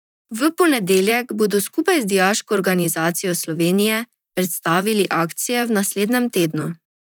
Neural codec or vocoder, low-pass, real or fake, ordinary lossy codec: vocoder, 44.1 kHz, 128 mel bands, Pupu-Vocoder; none; fake; none